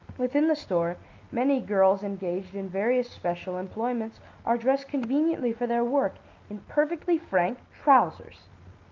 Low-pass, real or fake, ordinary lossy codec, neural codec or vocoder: 7.2 kHz; real; Opus, 32 kbps; none